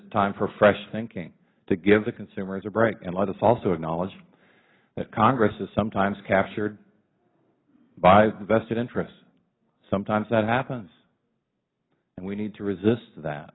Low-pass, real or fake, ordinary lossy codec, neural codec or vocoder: 7.2 kHz; real; AAC, 16 kbps; none